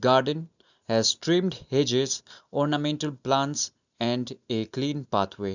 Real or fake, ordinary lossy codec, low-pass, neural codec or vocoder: real; none; 7.2 kHz; none